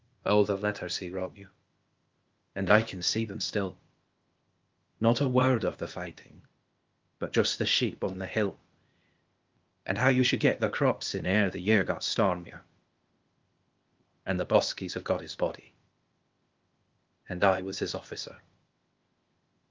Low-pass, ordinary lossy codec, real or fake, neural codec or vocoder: 7.2 kHz; Opus, 32 kbps; fake; codec, 16 kHz, 0.8 kbps, ZipCodec